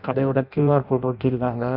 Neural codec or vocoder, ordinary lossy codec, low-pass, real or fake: codec, 16 kHz in and 24 kHz out, 0.6 kbps, FireRedTTS-2 codec; AAC, 48 kbps; 5.4 kHz; fake